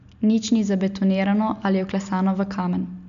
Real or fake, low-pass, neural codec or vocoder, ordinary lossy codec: real; 7.2 kHz; none; AAC, 96 kbps